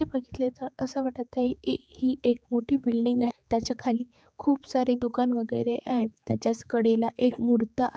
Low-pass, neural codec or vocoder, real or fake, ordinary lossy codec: none; codec, 16 kHz, 4 kbps, X-Codec, HuBERT features, trained on general audio; fake; none